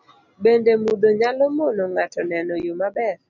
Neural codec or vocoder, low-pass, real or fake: none; 7.2 kHz; real